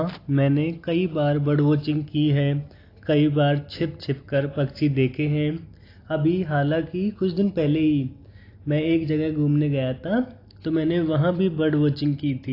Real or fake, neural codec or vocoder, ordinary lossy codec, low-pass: real; none; AAC, 32 kbps; 5.4 kHz